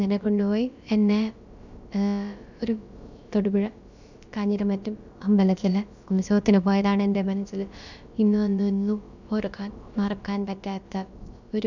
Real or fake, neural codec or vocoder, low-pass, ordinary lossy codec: fake; codec, 16 kHz, about 1 kbps, DyCAST, with the encoder's durations; 7.2 kHz; none